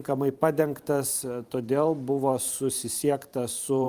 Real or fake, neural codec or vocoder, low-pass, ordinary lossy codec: fake; vocoder, 44.1 kHz, 128 mel bands every 512 samples, BigVGAN v2; 14.4 kHz; Opus, 32 kbps